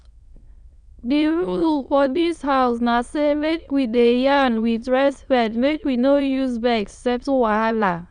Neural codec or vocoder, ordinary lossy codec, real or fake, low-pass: autoencoder, 22.05 kHz, a latent of 192 numbers a frame, VITS, trained on many speakers; none; fake; 9.9 kHz